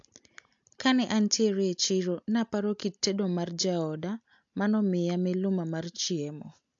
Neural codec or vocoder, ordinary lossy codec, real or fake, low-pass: none; AAC, 64 kbps; real; 7.2 kHz